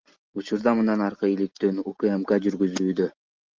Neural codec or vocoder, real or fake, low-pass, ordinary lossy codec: none; real; 7.2 kHz; Opus, 32 kbps